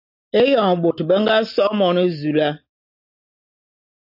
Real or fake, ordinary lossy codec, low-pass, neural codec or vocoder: real; AAC, 48 kbps; 5.4 kHz; none